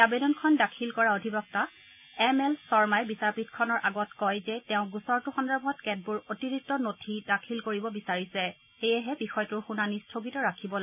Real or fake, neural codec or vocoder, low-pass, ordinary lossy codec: real; none; 3.6 kHz; none